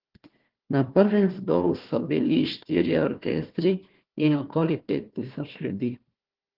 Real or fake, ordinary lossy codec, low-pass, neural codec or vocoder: fake; Opus, 16 kbps; 5.4 kHz; codec, 16 kHz, 1 kbps, FunCodec, trained on Chinese and English, 50 frames a second